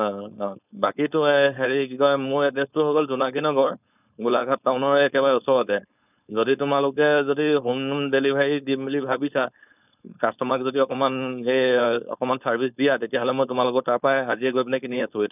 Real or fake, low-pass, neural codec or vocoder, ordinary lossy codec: fake; 3.6 kHz; codec, 16 kHz, 4.8 kbps, FACodec; none